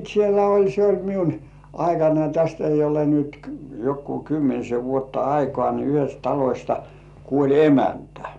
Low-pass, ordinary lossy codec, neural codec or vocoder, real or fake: 10.8 kHz; none; none; real